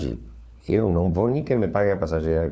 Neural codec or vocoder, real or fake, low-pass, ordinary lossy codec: codec, 16 kHz, 4 kbps, FunCodec, trained on LibriTTS, 50 frames a second; fake; none; none